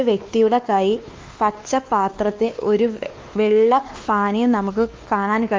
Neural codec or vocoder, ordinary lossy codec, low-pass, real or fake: codec, 16 kHz, 2 kbps, X-Codec, WavLM features, trained on Multilingual LibriSpeech; Opus, 32 kbps; 7.2 kHz; fake